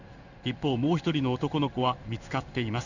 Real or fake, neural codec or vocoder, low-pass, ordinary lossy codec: fake; codec, 16 kHz in and 24 kHz out, 1 kbps, XY-Tokenizer; 7.2 kHz; none